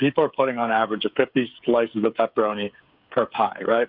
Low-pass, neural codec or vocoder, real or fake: 5.4 kHz; codec, 16 kHz, 8 kbps, FreqCodec, smaller model; fake